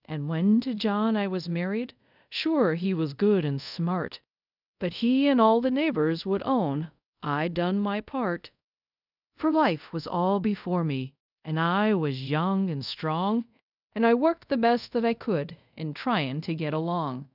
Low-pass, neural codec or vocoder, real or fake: 5.4 kHz; codec, 24 kHz, 0.5 kbps, DualCodec; fake